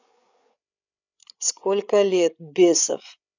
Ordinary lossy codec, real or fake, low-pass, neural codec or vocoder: none; fake; 7.2 kHz; codec, 16 kHz, 8 kbps, FreqCodec, larger model